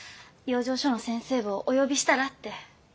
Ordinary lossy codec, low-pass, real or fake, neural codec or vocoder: none; none; real; none